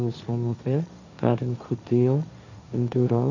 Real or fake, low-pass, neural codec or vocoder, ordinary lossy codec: fake; 7.2 kHz; codec, 16 kHz, 1.1 kbps, Voila-Tokenizer; none